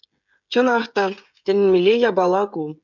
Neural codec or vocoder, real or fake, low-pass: codec, 16 kHz, 16 kbps, FreqCodec, smaller model; fake; 7.2 kHz